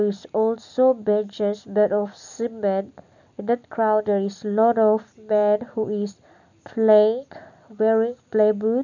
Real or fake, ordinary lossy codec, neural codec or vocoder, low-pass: real; none; none; 7.2 kHz